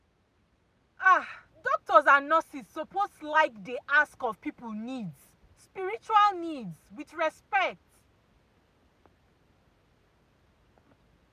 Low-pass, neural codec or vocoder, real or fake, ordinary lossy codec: 14.4 kHz; none; real; none